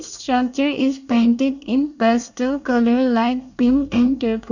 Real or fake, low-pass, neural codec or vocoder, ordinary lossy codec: fake; 7.2 kHz; codec, 24 kHz, 1 kbps, SNAC; none